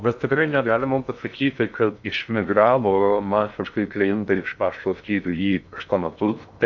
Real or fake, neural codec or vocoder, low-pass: fake; codec, 16 kHz in and 24 kHz out, 0.6 kbps, FocalCodec, streaming, 2048 codes; 7.2 kHz